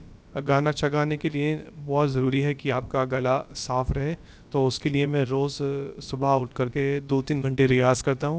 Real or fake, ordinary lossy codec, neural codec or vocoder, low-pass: fake; none; codec, 16 kHz, about 1 kbps, DyCAST, with the encoder's durations; none